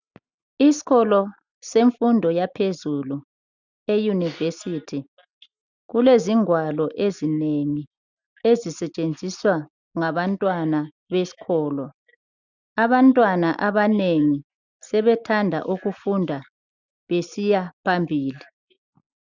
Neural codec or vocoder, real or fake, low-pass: none; real; 7.2 kHz